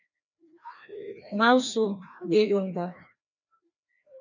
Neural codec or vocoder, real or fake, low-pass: codec, 16 kHz, 1 kbps, FreqCodec, larger model; fake; 7.2 kHz